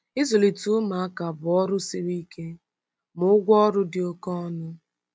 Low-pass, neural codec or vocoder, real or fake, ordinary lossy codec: none; none; real; none